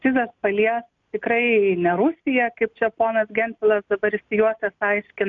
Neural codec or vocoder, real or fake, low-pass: none; real; 7.2 kHz